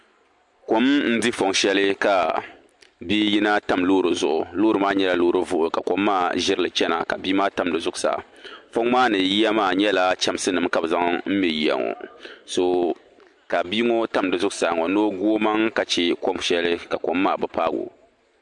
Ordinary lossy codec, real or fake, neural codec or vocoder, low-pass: MP3, 64 kbps; real; none; 10.8 kHz